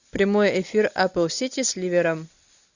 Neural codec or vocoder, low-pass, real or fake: none; 7.2 kHz; real